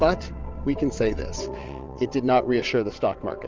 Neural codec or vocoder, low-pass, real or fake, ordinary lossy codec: vocoder, 22.05 kHz, 80 mel bands, WaveNeXt; 7.2 kHz; fake; Opus, 32 kbps